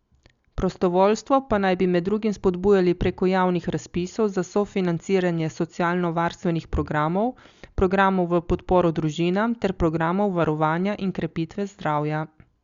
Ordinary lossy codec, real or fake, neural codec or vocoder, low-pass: Opus, 64 kbps; real; none; 7.2 kHz